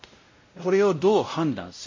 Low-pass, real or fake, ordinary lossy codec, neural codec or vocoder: 7.2 kHz; fake; MP3, 32 kbps; codec, 16 kHz, 0.5 kbps, X-Codec, WavLM features, trained on Multilingual LibriSpeech